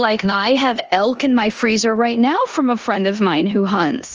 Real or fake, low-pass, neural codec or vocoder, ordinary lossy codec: fake; 7.2 kHz; codec, 16 kHz in and 24 kHz out, 0.9 kbps, LongCat-Audio-Codec, four codebook decoder; Opus, 24 kbps